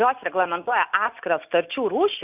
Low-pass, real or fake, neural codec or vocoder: 3.6 kHz; real; none